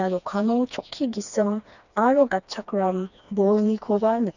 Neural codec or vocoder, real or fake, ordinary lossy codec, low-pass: codec, 16 kHz, 2 kbps, FreqCodec, smaller model; fake; none; 7.2 kHz